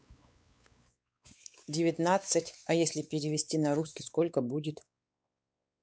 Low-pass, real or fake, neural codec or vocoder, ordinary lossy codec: none; fake; codec, 16 kHz, 4 kbps, X-Codec, WavLM features, trained on Multilingual LibriSpeech; none